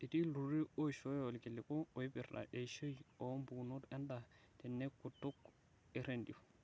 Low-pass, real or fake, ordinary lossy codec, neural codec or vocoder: none; real; none; none